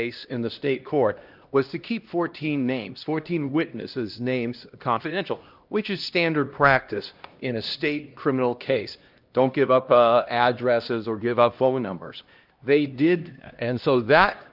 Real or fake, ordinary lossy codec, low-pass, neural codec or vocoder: fake; Opus, 24 kbps; 5.4 kHz; codec, 16 kHz, 1 kbps, X-Codec, HuBERT features, trained on LibriSpeech